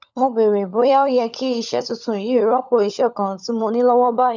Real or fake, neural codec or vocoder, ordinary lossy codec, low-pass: fake; codec, 16 kHz, 16 kbps, FunCodec, trained on LibriTTS, 50 frames a second; none; 7.2 kHz